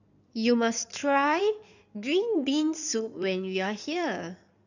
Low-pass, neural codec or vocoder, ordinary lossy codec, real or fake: 7.2 kHz; codec, 16 kHz in and 24 kHz out, 2.2 kbps, FireRedTTS-2 codec; none; fake